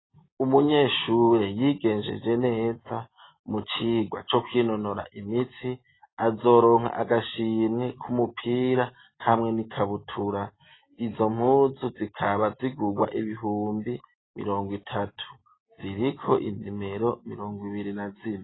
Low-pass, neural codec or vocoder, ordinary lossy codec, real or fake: 7.2 kHz; none; AAC, 16 kbps; real